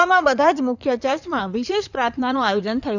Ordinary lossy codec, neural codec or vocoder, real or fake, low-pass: none; codec, 16 kHz in and 24 kHz out, 2.2 kbps, FireRedTTS-2 codec; fake; 7.2 kHz